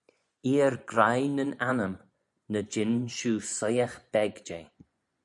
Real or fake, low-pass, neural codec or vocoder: fake; 10.8 kHz; vocoder, 24 kHz, 100 mel bands, Vocos